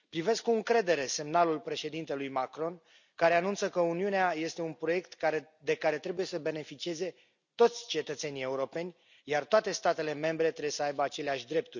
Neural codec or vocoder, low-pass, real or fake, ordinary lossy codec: none; 7.2 kHz; real; none